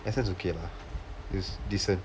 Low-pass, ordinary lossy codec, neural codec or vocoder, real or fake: none; none; none; real